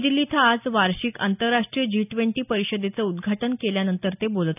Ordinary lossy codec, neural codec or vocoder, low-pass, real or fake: none; none; 3.6 kHz; real